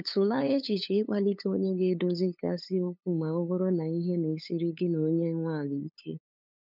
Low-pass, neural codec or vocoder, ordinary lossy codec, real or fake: 5.4 kHz; codec, 16 kHz, 8 kbps, FunCodec, trained on LibriTTS, 25 frames a second; none; fake